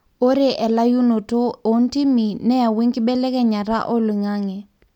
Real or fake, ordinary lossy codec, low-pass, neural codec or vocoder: real; MP3, 96 kbps; 19.8 kHz; none